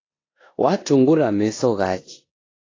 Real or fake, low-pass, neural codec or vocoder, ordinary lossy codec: fake; 7.2 kHz; codec, 16 kHz in and 24 kHz out, 0.9 kbps, LongCat-Audio-Codec, four codebook decoder; AAC, 32 kbps